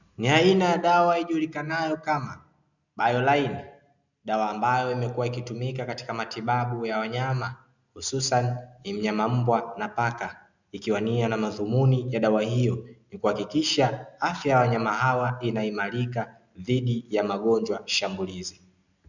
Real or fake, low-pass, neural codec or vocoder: real; 7.2 kHz; none